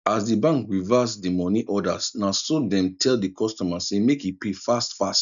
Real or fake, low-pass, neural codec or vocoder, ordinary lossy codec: real; 7.2 kHz; none; none